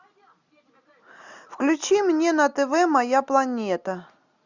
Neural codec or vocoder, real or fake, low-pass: none; real; 7.2 kHz